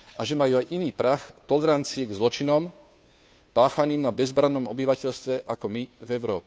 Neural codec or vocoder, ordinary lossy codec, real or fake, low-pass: codec, 16 kHz, 2 kbps, FunCodec, trained on Chinese and English, 25 frames a second; none; fake; none